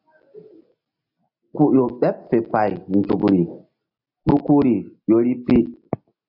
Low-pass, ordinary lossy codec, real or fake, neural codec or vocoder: 5.4 kHz; AAC, 48 kbps; real; none